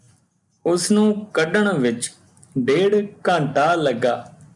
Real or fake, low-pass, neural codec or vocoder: real; 10.8 kHz; none